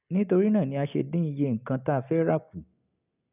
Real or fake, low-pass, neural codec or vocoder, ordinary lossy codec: real; 3.6 kHz; none; none